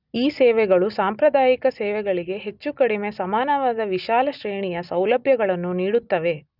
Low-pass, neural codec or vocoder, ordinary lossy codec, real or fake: 5.4 kHz; none; none; real